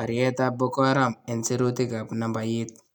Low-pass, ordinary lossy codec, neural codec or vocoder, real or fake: 19.8 kHz; none; none; real